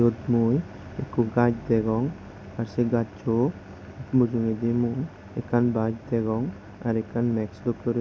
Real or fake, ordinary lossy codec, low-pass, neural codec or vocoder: real; none; none; none